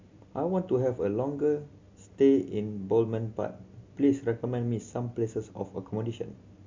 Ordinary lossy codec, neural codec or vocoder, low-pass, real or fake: MP3, 64 kbps; none; 7.2 kHz; real